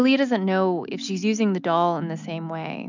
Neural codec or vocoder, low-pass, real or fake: none; 7.2 kHz; real